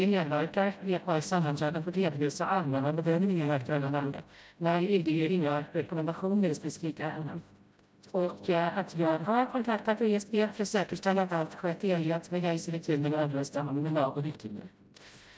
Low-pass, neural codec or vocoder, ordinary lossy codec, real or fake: none; codec, 16 kHz, 0.5 kbps, FreqCodec, smaller model; none; fake